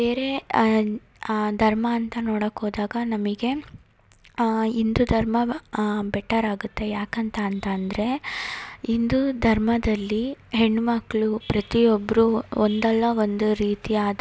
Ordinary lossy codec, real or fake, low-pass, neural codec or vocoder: none; real; none; none